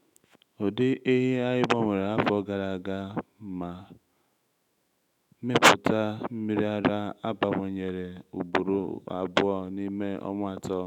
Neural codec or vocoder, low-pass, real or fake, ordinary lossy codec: autoencoder, 48 kHz, 128 numbers a frame, DAC-VAE, trained on Japanese speech; 19.8 kHz; fake; none